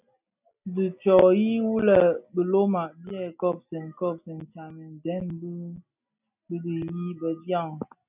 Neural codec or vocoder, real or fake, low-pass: none; real; 3.6 kHz